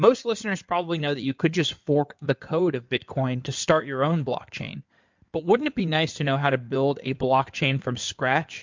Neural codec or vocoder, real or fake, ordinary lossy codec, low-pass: codec, 16 kHz in and 24 kHz out, 2.2 kbps, FireRedTTS-2 codec; fake; MP3, 64 kbps; 7.2 kHz